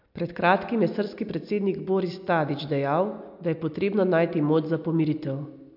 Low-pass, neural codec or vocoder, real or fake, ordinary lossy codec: 5.4 kHz; none; real; MP3, 48 kbps